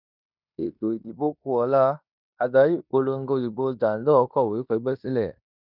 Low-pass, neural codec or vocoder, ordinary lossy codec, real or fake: 5.4 kHz; codec, 16 kHz in and 24 kHz out, 0.9 kbps, LongCat-Audio-Codec, fine tuned four codebook decoder; none; fake